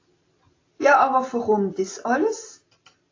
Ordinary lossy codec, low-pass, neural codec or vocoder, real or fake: AAC, 32 kbps; 7.2 kHz; none; real